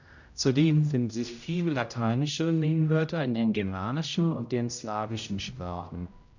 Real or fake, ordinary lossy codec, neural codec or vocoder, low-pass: fake; none; codec, 16 kHz, 0.5 kbps, X-Codec, HuBERT features, trained on general audio; 7.2 kHz